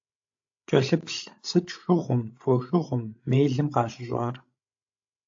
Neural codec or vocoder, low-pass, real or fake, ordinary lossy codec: codec, 16 kHz, 16 kbps, FreqCodec, larger model; 7.2 kHz; fake; AAC, 48 kbps